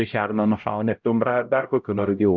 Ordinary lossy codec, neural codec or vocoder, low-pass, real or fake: Opus, 24 kbps; codec, 16 kHz, 0.5 kbps, X-Codec, HuBERT features, trained on LibriSpeech; 7.2 kHz; fake